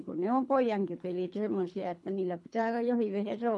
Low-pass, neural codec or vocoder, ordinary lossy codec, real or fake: 10.8 kHz; codec, 24 kHz, 3 kbps, HILCodec; MP3, 64 kbps; fake